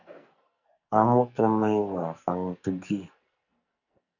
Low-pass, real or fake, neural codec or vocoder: 7.2 kHz; fake; codec, 44.1 kHz, 2.6 kbps, SNAC